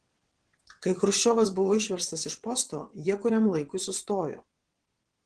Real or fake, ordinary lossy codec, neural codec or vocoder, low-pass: fake; Opus, 16 kbps; vocoder, 22.05 kHz, 80 mel bands, WaveNeXt; 9.9 kHz